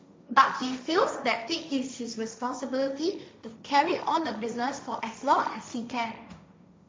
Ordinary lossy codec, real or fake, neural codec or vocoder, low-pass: none; fake; codec, 16 kHz, 1.1 kbps, Voila-Tokenizer; none